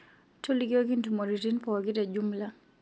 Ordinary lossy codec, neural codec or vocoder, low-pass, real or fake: none; none; none; real